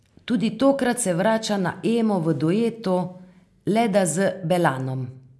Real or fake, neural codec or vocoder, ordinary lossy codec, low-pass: real; none; none; none